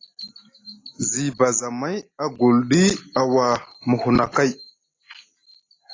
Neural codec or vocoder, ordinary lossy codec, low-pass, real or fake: none; AAC, 32 kbps; 7.2 kHz; real